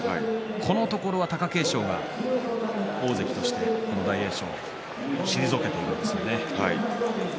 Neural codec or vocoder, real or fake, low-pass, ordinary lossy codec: none; real; none; none